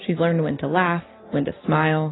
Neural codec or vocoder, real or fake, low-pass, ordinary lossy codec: none; real; 7.2 kHz; AAC, 16 kbps